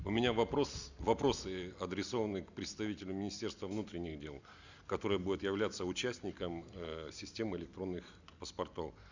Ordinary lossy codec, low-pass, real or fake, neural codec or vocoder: Opus, 64 kbps; 7.2 kHz; real; none